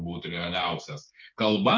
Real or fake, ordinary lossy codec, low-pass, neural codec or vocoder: fake; MP3, 64 kbps; 7.2 kHz; codec, 16 kHz, 8 kbps, FreqCodec, smaller model